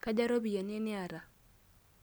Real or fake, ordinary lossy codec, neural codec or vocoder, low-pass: real; none; none; none